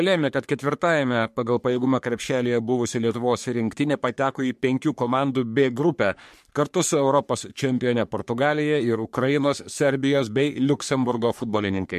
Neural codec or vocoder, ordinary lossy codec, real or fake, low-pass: codec, 44.1 kHz, 3.4 kbps, Pupu-Codec; MP3, 64 kbps; fake; 14.4 kHz